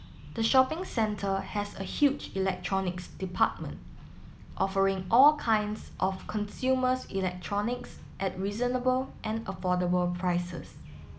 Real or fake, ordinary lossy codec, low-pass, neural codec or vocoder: real; none; none; none